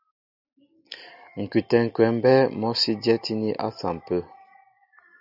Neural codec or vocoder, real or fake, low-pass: none; real; 5.4 kHz